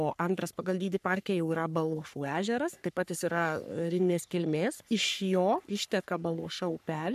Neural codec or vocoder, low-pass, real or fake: codec, 44.1 kHz, 3.4 kbps, Pupu-Codec; 14.4 kHz; fake